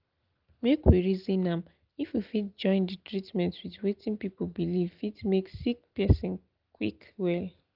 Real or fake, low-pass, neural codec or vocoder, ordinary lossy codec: real; 5.4 kHz; none; Opus, 32 kbps